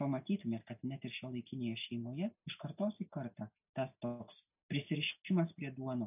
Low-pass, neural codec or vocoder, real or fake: 3.6 kHz; none; real